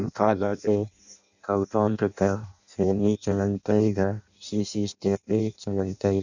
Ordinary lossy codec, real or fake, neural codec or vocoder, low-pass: none; fake; codec, 16 kHz in and 24 kHz out, 0.6 kbps, FireRedTTS-2 codec; 7.2 kHz